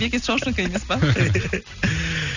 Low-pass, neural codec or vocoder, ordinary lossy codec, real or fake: 7.2 kHz; none; none; real